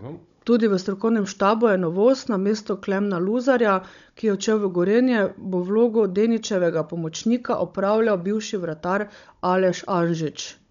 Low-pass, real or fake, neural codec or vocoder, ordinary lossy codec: 7.2 kHz; fake; codec, 16 kHz, 16 kbps, FunCodec, trained on Chinese and English, 50 frames a second; none